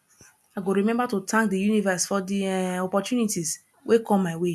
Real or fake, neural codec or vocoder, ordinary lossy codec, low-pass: real; none; none; none